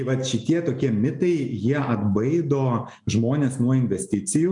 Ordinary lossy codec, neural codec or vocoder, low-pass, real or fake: MP3, 96 kbps; none; 10.8 kHz; real